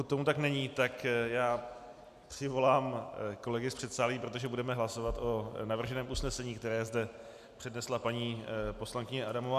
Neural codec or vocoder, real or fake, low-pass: none; real; 14.4 kHz